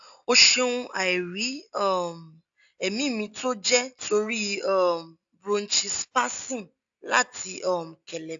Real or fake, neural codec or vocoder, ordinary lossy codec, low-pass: real; none; AAC, 64 kbps; 7.2 kHz